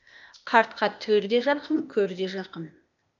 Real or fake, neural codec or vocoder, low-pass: fake; codec, 16 kHz, 0.8 kbps, ZipCodec; 7.2 kHz